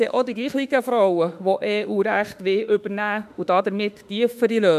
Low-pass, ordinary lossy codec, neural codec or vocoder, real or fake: 14.4 kHz; none; autoencoder, 48 kHz, 32 numbers a frame, DAC-VAE, trained on Japanese speech; fake